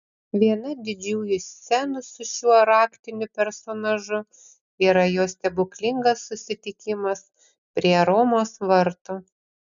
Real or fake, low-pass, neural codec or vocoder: real; 7.2 kHz; none